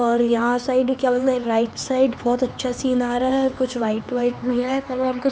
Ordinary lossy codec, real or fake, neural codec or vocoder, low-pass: none; fake; codec, 16 kHz, 4 kbps, X-Codec, HuBERT features, trained on LibriSpeech; none